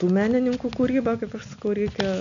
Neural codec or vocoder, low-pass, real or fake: none; 7.2 kHz; real